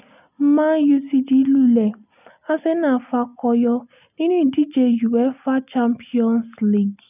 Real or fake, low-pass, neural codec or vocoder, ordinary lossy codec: real; 3.6 kHz; none; none